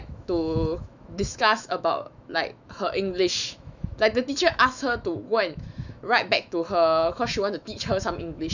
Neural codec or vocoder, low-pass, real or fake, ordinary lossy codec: autoencoder, 48 kHz, 128 numbers a frame, DAC-VAE, trained on Japanese speech; 7.2 kHz; fake; none